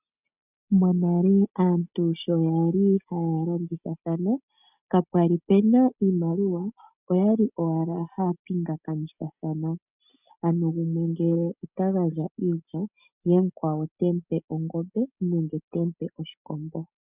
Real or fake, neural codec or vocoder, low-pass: real; none; 3.6 kHz